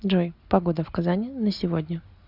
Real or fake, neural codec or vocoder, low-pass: real; none; 5.4 kHz